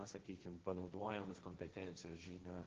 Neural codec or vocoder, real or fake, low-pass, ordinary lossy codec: codec, 16 kHz, 1.1 kbps, Voila-Tokenizer; fake; 7.2 kHz; Opus, 16 kbps